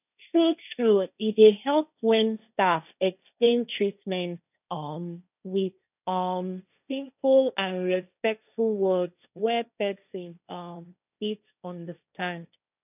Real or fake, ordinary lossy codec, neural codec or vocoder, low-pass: fake; none; codec, 16 kHz, 1.1 kbps, Voila-Tokenizer; 3.6 kHz